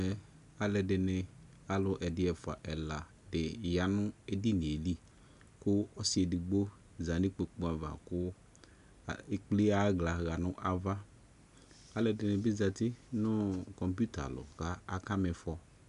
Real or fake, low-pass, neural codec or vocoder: real; 10.8 kHz; none